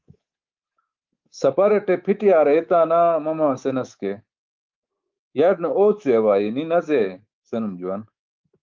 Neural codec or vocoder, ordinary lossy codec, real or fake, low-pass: codec, 24 kHz, 3.1 kbps, DualCodec; Opus, 32 kbps; fake; 7.2 kHz